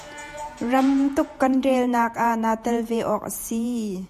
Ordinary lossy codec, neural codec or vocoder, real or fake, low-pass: MP3, 96 kbps; vocoder, 48 kHz, 128 mel bands, Vocos; fake; 14.4 kHz